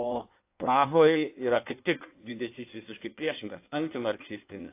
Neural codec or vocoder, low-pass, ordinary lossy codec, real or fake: codec, 16 kHz in and 24 kHz out, 1.1 kbps, FireRedTTS-2 codec; 3.6 kHz; AAC, 24 kbps; fake